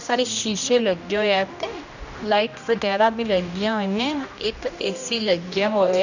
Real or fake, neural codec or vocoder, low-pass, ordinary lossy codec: fake; codec, 16 kHz, 1 kbps, X-Codec, HuBERT features, trained on general audio; 7.2 kHz; none